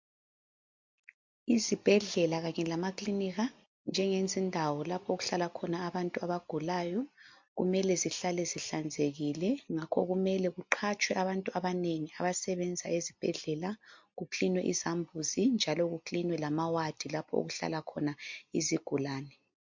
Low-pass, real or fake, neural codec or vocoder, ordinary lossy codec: 7.2 kHz; real; none; MP3, 48 kbps